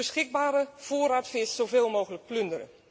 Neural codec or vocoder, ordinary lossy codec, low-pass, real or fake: none; none; none; real